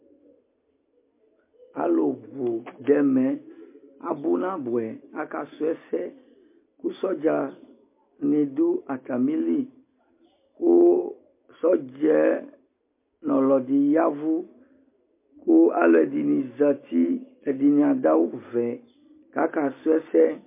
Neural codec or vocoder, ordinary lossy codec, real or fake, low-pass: none; MP3, 24 kbps; real; 3.6 kHz